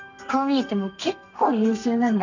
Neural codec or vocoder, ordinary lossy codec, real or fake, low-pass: codec, 32 kHz, 1.9 kbps, SNAC; none; fake; 7.2 kHz